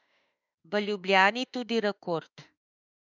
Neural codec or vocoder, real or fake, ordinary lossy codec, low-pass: autoencoder, 48 kHz, 32 numbers a frame, DAC-VAE, trained on Japanese speech; fake; none; 7.2 kHz